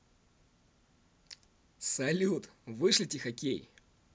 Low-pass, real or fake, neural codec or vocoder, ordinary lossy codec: none; real; none; none